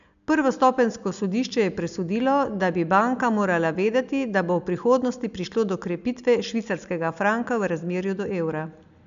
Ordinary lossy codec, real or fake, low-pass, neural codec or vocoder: AAC, 96 kbps; real; 7.2 kHz; none